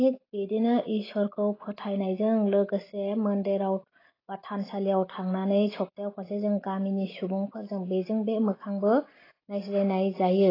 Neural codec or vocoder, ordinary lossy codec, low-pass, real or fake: none; AAC, 24 kbps; 5.4 kHz; real